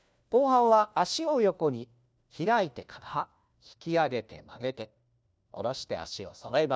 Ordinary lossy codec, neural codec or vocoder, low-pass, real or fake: none; codec, 16 kHz, 1 kbps, FunCodec, trained on LibriTTS, 50 frames a second; none; fake